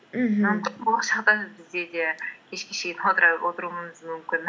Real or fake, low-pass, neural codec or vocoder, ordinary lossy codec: real; none; none; none